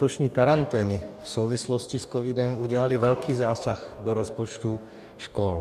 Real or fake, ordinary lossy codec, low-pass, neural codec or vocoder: fake; AAC, 96 kbps; 14.4 kHz; codec, 44.1 kHz, 2.6 kbps, DAC